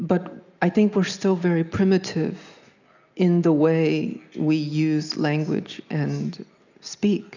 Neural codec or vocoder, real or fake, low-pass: none; real; 7.2 kHz